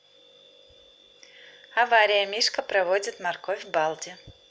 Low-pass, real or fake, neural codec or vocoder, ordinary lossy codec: none; real; none; none